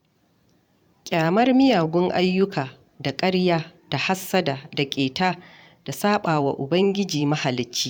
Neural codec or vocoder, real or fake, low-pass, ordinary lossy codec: vocoder, 44.1 kHz, 128 mel bands every 512 samples, BigVGAN v2; fake; 19.8 kHz; none